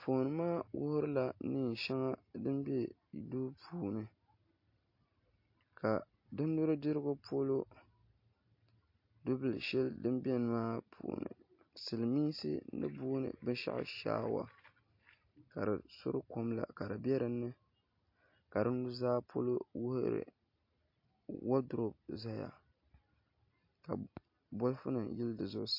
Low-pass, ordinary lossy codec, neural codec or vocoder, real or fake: 5.4 kHz; MP3, 32 kbps; none; real